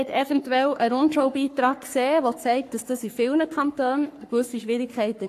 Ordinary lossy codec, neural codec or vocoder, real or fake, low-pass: AAC, 64 kbps; codec, 44.1 kHz, 3.4 kbps, Pupu-Codec; fake; 14.4 kHz